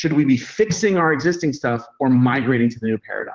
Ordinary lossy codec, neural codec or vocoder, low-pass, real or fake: Opus, 16 kbps; vocoder, 44.1 kHz, 128 mel bands, Pupu-Vocoder; 7.2 kHz; fake